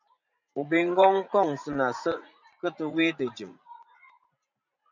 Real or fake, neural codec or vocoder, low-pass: fake; vocoder, 44.1 kHz, 80 mel bands, Vocos; 7.2 kHz